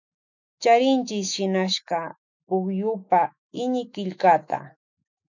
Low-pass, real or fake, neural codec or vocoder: 7.2 kHz; fake; autoencoder, 48 kHz, 128 numbers a frame, DAC-VAE, trained on Japanese speech